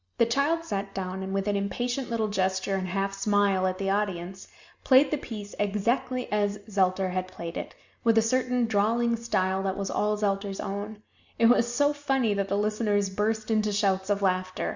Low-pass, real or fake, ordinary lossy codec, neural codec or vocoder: 7.2 kHz; real; Opus, 64 kbps; none